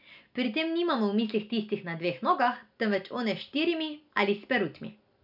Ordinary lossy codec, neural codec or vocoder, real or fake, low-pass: none; none; real; 5.4 kHz